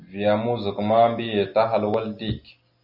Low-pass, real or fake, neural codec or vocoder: 5.4 kHz; real; none